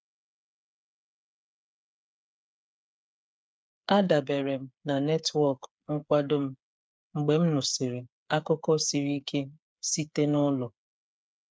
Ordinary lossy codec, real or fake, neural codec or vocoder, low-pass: none; fake; codec, 16 kHz, 8 kbps, FreqCodec, smaller model; none